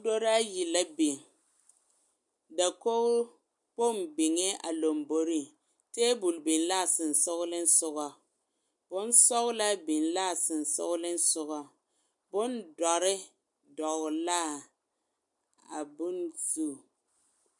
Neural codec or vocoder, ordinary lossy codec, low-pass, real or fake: none; MP3, 64 kbps; 10.8 kHz; real